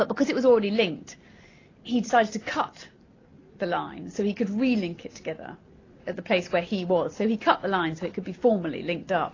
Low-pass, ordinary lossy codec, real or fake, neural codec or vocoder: 7.2 kHz; AAC, 32 kbps; real; none